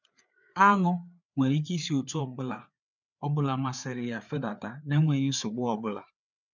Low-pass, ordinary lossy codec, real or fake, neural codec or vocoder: 7.2 kHz; none; fake; codec, 16 kHz, 4 kbps, FreqCodec, larger model